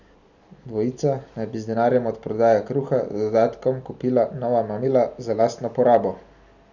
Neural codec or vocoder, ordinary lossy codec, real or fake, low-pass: none; none; real; 7.2 kHz